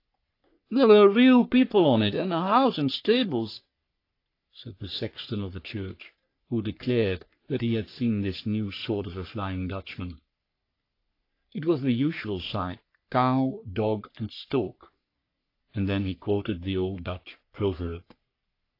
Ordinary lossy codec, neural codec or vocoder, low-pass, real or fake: AAC, 32 kbps; codec, 44.1 kHz, 3.4 kbps, Pupu-Codec; 5.4 kHz; fake